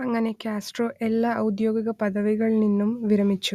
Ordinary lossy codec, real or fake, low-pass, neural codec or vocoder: Opus, 64 kbps; real; 14.4 kHz; none